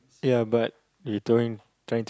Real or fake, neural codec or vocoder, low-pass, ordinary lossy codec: real; none; none; none